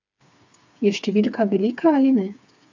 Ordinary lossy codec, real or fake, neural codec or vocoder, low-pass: MP3, 64 kbps; fake; codec, 16 kHz, 4 kbps, FreqCodec, smaller model; 7.2 kHz